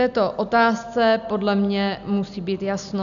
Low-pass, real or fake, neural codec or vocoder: 7.2 kHz; real; none